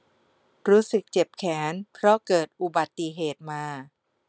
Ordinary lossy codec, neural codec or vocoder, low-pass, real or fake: none; none; none; real